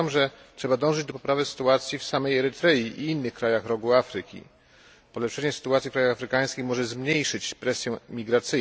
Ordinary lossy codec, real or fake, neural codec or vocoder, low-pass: none; real; none; none